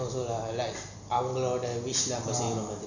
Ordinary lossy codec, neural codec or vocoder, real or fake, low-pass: none; none; real; 7.2 kHz